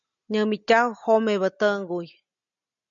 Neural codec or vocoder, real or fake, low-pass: none; real; 7.2 kHz